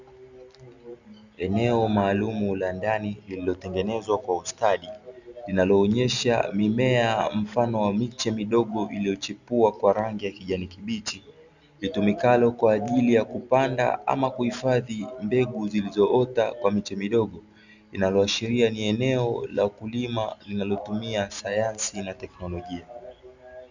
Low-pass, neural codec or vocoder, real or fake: 7.2 kHz; none; real